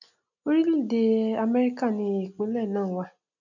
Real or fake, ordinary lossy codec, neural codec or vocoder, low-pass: real; none; none; 7.2 kHz